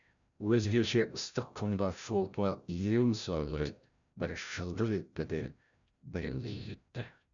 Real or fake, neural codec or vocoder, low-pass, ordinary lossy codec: fake; codec, 16 kHz, 0.5 kbps, FreqCodec, larger model; 7.2 kHz; none